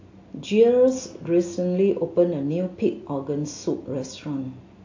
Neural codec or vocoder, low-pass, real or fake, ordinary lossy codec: none; 7.2 kHz; real; AAC, 48 kbps